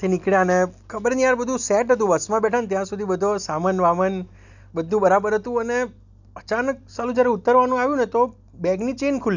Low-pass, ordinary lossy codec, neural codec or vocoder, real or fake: 7.2 kHz; none; none; real